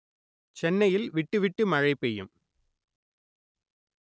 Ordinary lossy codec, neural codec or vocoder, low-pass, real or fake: none; none; none; real